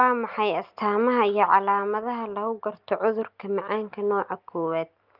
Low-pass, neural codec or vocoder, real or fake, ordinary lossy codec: 5.4 kHz; none; real; Opus, 32 kbps